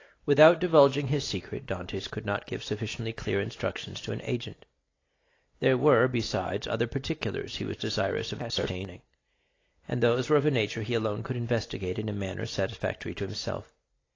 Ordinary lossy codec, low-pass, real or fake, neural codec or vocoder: AAC, 32 kbps; 7.2 kHz; real; none